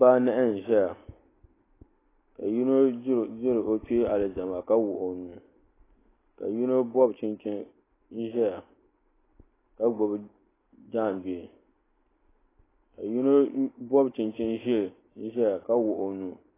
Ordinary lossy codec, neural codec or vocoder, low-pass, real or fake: AAC, 16 kbps; none; 3.6 kHz; real